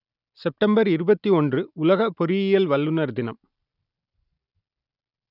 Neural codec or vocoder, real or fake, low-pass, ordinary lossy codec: none; real; 5.4 kHz; none